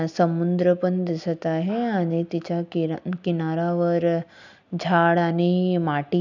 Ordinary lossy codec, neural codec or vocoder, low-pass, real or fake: none; none; 7.2 kHz; real